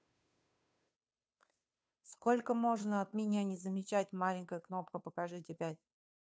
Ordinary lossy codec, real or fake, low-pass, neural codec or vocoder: none; fake; none; codec, 16 kHz, 2 kbps, FunCodec, trained on Chinese and English, 25 frames a second